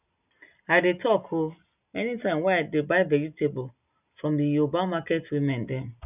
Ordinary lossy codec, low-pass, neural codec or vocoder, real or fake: none; 3.6 kHz; none; real